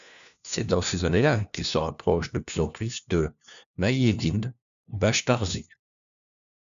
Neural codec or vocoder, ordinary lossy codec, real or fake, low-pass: codec, 16 kHz, 1 kbps, FunCodec, trained on LibriTTS, 50 frames a second; AAC, 96 kbps; fake; 7.2 kHz